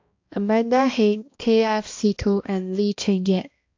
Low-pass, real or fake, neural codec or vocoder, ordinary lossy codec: 7.2 kHz; fake; codec, 16 kHz, 1 kbps, X-Codec, HuBERT features, trained on balanced general audio; AAC, 48 kbps